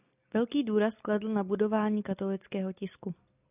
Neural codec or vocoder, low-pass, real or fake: none; 3.6 kHz; real